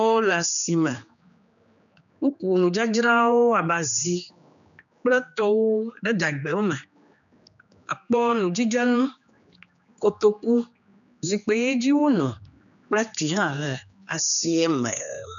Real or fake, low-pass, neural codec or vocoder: fake; 7.2 kHz; codec, 16 kHz, 2 kbps, X-Codec, HuBERT features, trained on general audio